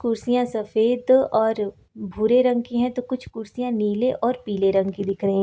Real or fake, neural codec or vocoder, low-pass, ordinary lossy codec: real; none; none; none